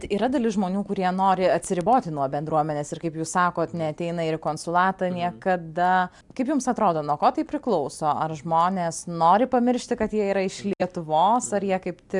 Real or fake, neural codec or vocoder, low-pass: real; none; 10.8 kHz